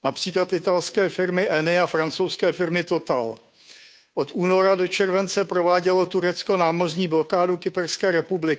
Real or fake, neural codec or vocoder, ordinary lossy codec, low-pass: fake; codec, 16 kHz, 2 kbps, FunCodec, trained on Chinese and English, 25 frames a second; none; none